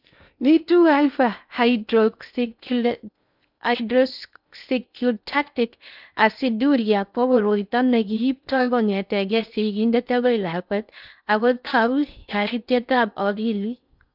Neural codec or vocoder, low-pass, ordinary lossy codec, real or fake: codec, 16 kHz in and 24 kHz out, 0.6 kbps, FocalCodec, streaming, 2048 codes; 5.4 kHz; none; fake